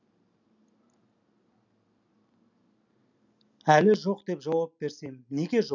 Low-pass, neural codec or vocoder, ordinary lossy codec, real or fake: 7.2 kHz; none; none; real